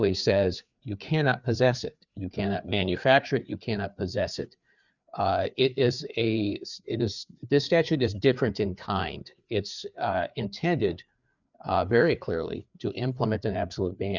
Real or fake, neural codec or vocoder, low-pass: fake; codec, 16 kHz, 4 kbps, FreqCodec, larger model; 7.2 kHz